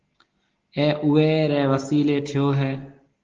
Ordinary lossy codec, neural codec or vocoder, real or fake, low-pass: Opus, 16 kbps; none; real; 7.2 kHz